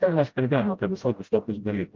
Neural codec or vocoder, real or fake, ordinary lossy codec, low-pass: codec, 16 kHz, 0.5 kbps, FreqCodec, smaller model; fake; Opus, 32 kbps; 7.2 kHz